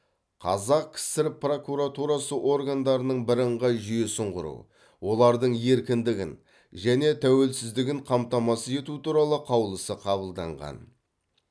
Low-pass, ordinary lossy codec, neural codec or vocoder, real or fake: none; none; none; real